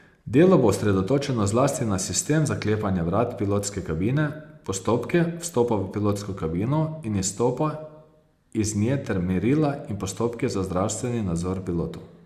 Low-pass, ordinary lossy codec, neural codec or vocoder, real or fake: 14.4 kHz; Opus, 64 kbps; none; real